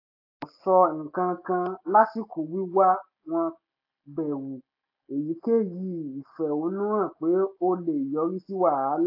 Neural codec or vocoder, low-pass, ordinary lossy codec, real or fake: none; 5.4 kHz; AAC, 32 kbps; real